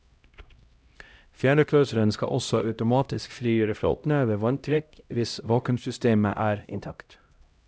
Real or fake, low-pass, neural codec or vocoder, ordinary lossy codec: fake; none; codec, 16 kHz, 0.5 kbps, X-Codec, HuBERT features, trained on LibriSpeech; none